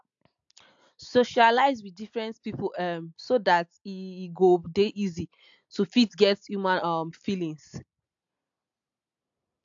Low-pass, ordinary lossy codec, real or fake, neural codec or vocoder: 7.2 kHz; AAC, 64 kbps; real; none